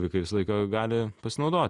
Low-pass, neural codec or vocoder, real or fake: 10.8 kHz; none; real